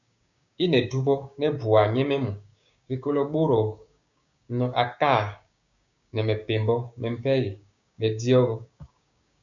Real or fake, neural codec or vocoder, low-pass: fake; codec, 16 kHz, 6 kbps, DAC; 7.2 kHz